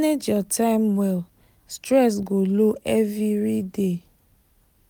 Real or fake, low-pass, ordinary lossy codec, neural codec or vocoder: real; none; none; none